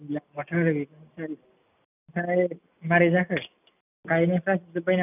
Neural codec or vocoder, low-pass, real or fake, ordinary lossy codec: none; 3.6 kHz; real; none